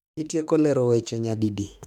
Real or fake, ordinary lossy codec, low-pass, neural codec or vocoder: fake; none; 19.8 kHz; autoencoder, 48 kHz, 32 numbers a frame, DAC-VAE, trained on Japanese speech